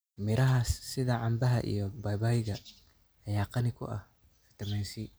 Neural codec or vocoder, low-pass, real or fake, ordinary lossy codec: none; none; real; none